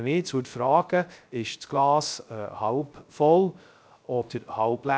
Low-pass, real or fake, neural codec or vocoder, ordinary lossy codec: none; fake; codec, 16 kHz, 0.3 kbps, FocalCodec; none